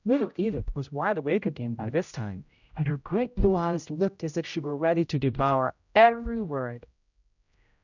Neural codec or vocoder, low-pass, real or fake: codec, 16 kHz, 0.5 kbps, X-Codec, HuBERT features, trained on general audio; 7.2 kHz; fake